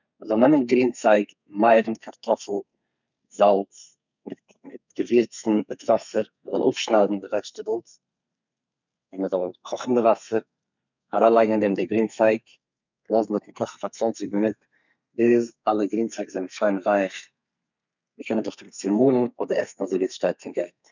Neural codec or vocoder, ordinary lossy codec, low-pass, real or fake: codec, 32 kHz, 1.9 kbps, SNAC; none; 7.2 kHz; fake